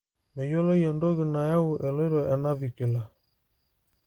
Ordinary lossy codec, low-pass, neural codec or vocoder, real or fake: Opus, 24 kbps; 19.8 kHz; none; real